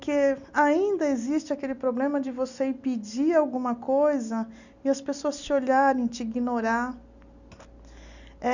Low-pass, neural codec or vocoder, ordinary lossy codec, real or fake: 7.2 kHz; none; none; real